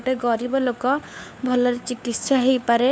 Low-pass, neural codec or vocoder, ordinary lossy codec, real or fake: none; codec, 16 kHz, 4 kbps, FunCodec, trained on LibriTTS, 50 frames a second; none; fake